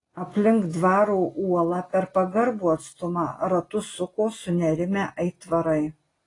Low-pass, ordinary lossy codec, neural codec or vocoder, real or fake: 10.8 kHz; AAC, 32 kbps; none; real